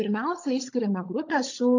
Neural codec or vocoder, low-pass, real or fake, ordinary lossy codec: codec, 16 kHz, 16 kbps, FunCodec, trained on LibriTTS, 50 frames a second; 7.2 kHz; fake; AAC, 48 kbps